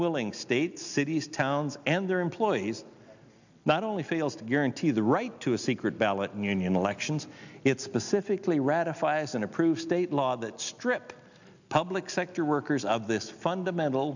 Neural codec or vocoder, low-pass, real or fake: none; 7.2 kHz; real